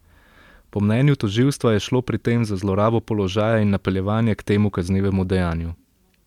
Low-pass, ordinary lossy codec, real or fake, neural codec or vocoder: 19.8 kHz; MP3, 96 kbps; real; none